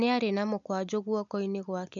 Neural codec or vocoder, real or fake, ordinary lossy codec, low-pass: none; real; none; 7.2 kHz